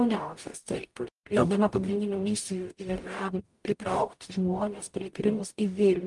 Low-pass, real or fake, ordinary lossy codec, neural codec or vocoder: 10.8 kHz; fake; Opus, 32 kbps; codec, 44.1 kHz, 0.9 kbps, DAC